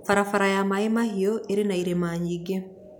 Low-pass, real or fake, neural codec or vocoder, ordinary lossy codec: 19.8 kHz; real; none; none